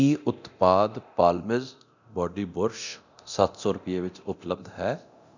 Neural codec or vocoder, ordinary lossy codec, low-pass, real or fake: codec, 24 kHz, 0.9 kbps, DualCodec; none; 7.2 kHz; fake